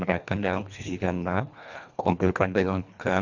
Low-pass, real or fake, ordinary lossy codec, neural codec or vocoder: 7.2 kHz; fake; none; codec, 24 kHz, 1.5 kbps, HILCodec